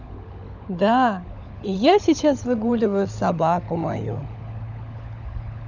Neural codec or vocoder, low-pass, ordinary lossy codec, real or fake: codec, 16 kHz, 16 kbps, FunCodec, trained on LibriTTS, 50 frames a second; 7.2 kHz; none; fake